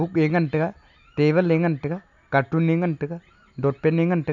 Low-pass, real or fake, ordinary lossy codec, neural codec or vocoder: 7.2 kHz; real; none; none